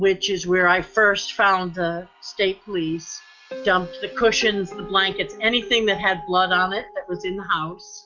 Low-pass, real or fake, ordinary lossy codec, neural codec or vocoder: 7.2 kHz; real; Opus, 64 kbps; none